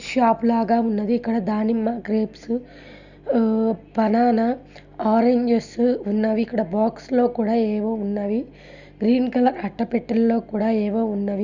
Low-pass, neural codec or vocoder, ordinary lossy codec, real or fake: 7.2 kHz; none; Opus, 64 kbps; real